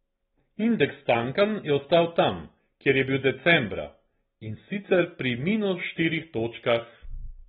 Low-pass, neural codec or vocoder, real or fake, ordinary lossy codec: 19.8 kHz; none; real; AAC, 16 kbps